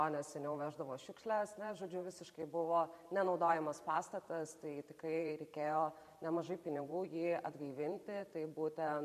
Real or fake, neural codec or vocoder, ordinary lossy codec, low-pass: fake; vocoder, 44.1 kHz, 128 mel bands every 512 samples, BigVGAN v2; MP3, 96 kbps; 14.4 kHz